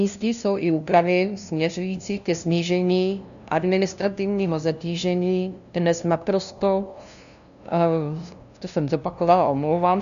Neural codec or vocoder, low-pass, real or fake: codec, 16 kHz, 0.5 kbps, FunCodec, trained on LibriTTS, 25 frames a second; 7.2 kHz; fake